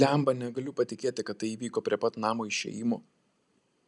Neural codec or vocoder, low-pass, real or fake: none; 10.8 kHz; real